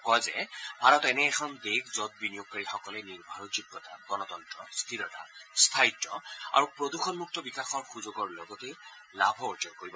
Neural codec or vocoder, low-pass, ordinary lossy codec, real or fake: none; none; none; real